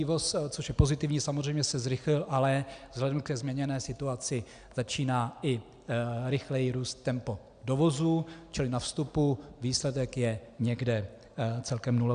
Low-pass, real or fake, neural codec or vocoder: 9.9 kHz; real; none